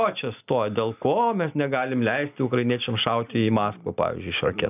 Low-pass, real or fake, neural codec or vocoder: 3.6 kHz; real; none